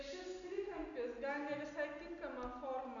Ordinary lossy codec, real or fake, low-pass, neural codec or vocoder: MP3, 96 kbps; real; 7.2 kHz; none